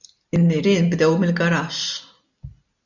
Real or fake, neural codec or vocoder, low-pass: real; none; 7.2 kHz